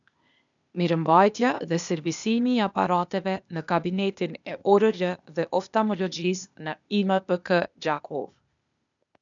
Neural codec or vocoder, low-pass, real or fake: codec, 16 kHz, 0.8 kbps, ZipCodec; 7.2 kHz; fake